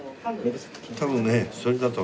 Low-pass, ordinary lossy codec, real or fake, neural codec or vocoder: none; none; real; none